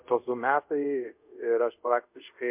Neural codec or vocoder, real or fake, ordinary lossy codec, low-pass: codec, 24 kHz, 0.5 kbps, DualCodec; fake; MP3, 32 kbps; 3.6 kHz